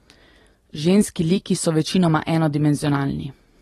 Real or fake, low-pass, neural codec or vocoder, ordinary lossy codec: real; 19.8 kHz; none; AAC, 32 kbps